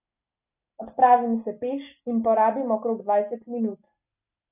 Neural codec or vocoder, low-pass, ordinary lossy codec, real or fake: none; 3.6 kHz; none; real